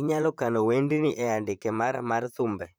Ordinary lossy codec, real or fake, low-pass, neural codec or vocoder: none; fake; none; vocoder, 44.1 kHz, 128 mel bands, Pupu-Vocoder